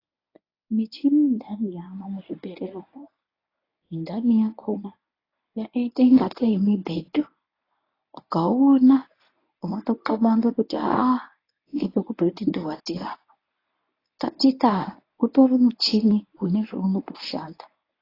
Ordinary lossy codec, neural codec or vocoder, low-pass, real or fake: AAC, 24 kbps; codec, 24 kHz, 0.9 kbps, WavTokenizer, medium speech release version 1; 5.4 kHz; fake